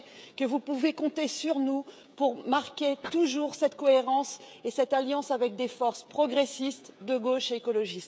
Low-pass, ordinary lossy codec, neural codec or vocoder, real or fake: none; none; codec, 16 kHz, 16 kbps, FreqCodec, smaller model; fake